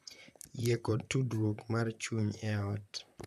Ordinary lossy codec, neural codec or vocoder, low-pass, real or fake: none; vocoder, 44.1 kHz, 128 mel bands, Pupu-Vocoder; 14.4 kHz; fake